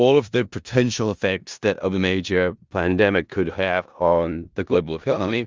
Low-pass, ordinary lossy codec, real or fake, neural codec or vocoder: 7.2 kHz; Opus, 32 kbps; fake; codec, 16 kHz in and 24 kHz out, 0.4 kbps, LongCat-Audio-Codec, four codebook decoder